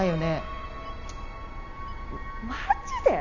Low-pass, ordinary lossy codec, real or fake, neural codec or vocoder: 7.2 kHz; none; real; none